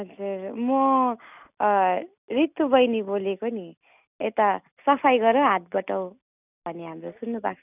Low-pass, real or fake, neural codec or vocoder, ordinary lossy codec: 3.6 kHz; real; none; none